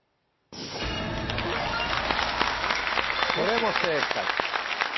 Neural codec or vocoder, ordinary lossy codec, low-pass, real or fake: none; MP3, 24 kbps; 7.2 kHz; real